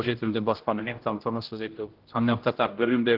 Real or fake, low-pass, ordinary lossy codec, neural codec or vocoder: fake; 5.4 kHz; Opus, 16 kbps; codec, 16 kHz, 0.5 kbps, X-Codec, HuBERT features, trained on general audio